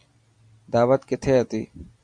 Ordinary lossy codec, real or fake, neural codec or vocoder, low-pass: Opus, 64 kbps; real; none; 9.9 kHz